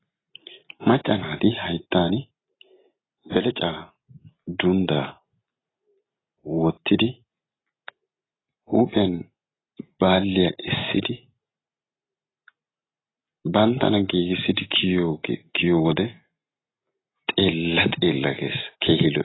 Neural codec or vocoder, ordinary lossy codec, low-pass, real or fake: none; AAC, 16 kbps; 7.2 kHz; real